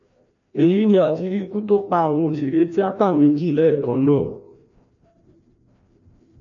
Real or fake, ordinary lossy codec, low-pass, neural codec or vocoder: fake; none; 7.2 kHz; codec, 16 kHz, 1 kbps, FreqCodec, larger model